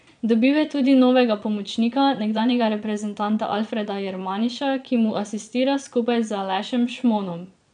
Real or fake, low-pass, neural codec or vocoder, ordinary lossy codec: fake; 9.9 kHz; vocoder, 22.05 kHz, 80 mel bands, Vocos; none